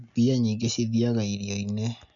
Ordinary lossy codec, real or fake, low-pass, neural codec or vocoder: none; real; 7.2 kHz; none